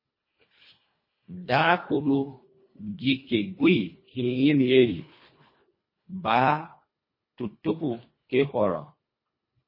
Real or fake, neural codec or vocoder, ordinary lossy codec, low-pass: fake; codec, 24 kHz, 1.5 kbps, HILCodec; MP3, 24 kbps; 5.4 kHz